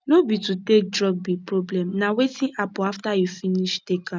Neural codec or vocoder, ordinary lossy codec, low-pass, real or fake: none; none; none; real